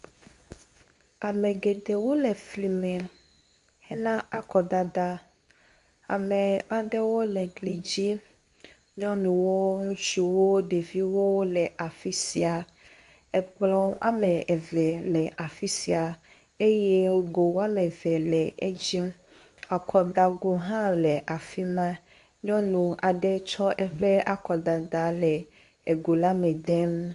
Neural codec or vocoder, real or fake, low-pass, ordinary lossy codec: codec, 24 kHz, 0.9 kbps, WavTokenizer, medium speech release version 2; fake; 10.8 kHz; MP3, 96 kbps